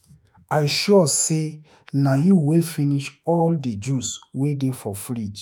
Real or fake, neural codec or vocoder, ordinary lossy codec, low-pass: fake; autoencoder, 48 kHz, 32 numbers a frame, DAC-VAE, trained on Japanese speech; none; none